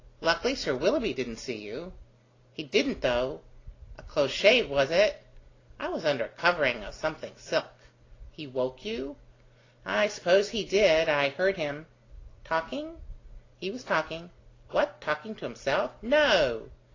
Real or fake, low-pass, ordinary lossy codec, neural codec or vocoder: real; 7.2 kHz; AAC, 32 kbps; none